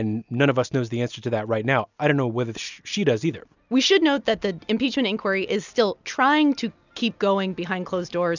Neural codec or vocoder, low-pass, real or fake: none; 7.2 kHz; real